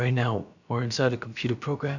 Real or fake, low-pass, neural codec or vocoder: fake; 7.2 kHz; codec, 16 kHz, about 1 kbps, DyCAST, with the encoder's durations